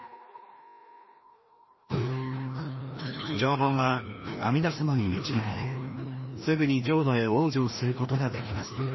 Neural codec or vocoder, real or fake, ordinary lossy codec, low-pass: codec, 16 kHz, 1 kbps, FreqCodec, larger model; fake; MP3, 24 kbps; 7.2 kHz